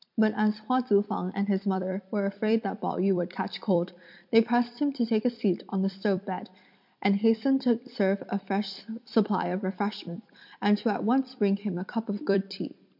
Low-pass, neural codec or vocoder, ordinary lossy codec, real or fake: 5.4 kHz; vocoder, 44.1 kHz, 128 mel bands every 256 samples, BigVGAN v2; MP3, 48 kbps; fake